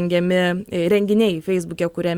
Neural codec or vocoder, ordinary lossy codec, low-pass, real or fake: none; Opus, 64 kbps; 19.8 kHz; real